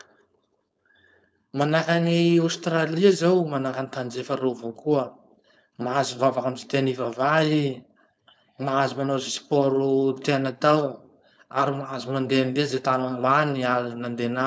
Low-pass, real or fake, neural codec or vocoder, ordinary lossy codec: none; fake; codec, 16 kHz, 4.8 kbps, FACodec; none